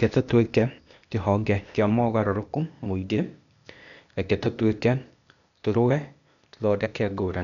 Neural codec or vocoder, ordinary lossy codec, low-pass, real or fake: codec, 16 kHz, 0.8 kbps, ZipCodec; none; 7.2 kHz; fake